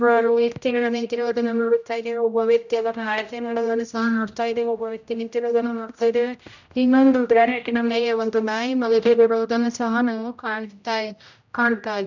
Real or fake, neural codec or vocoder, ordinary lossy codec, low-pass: fake; codec, 16 kHz, 0.5 kbps, X-Codec, HuBERT features, trained on general audio; none; 7.2 kHz